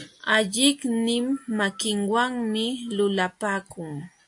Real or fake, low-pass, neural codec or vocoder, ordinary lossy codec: real; 10.8 kHz; none; AAC, 64 kbps